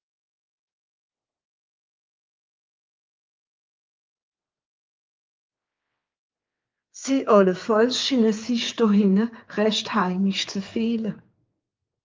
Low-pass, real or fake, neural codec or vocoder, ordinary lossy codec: 7.2 kHz; fake; codec, 16 kHz, 4 kbps, X-Codec, HuBERT features, trained on general audio; Opus, 24 kbps